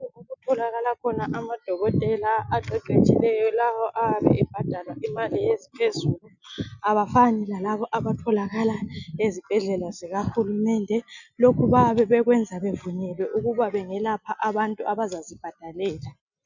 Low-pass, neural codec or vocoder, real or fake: 7.2 kHz; none; real